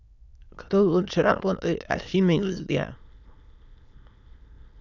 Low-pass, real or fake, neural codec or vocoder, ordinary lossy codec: 7.2 kHz; fake; autoencoder, 22.05 kHz, a latent of 192 numbers a frame, VITS, trained on many speakers; none